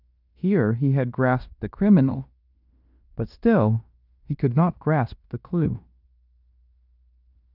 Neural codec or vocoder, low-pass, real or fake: codec, 16 kHz in and 24 kHz out, 0.9 kbps, LongCat-Audio-Codec, fine tuned four codebook decoder; 5.4 kHz; fake